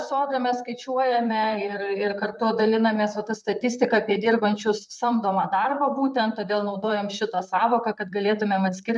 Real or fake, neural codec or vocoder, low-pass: fake; vocoder, 44.1 kHz, 128 mel bands, Pupu-Vocoder; 10.8 kHz